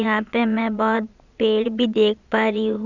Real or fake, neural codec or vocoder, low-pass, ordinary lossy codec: fake; vocoder, 22.05 kHz, 80 mel bands, Vocos; 7.2 kHz; none